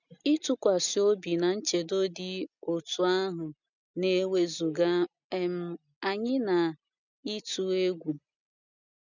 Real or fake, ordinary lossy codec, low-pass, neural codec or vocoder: real; none; 7.2 kHz; none